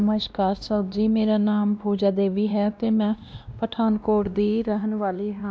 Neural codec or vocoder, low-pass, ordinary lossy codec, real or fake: codec, 16 kHz, 1 kbps, X-Codec, WavLM features, trained on Multilingual LibriSpeech; none; none; fake